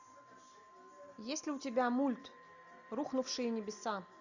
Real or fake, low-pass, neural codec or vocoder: real; 7.2 kHz; none